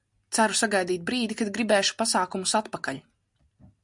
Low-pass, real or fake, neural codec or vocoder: 10.8 kHz; real; none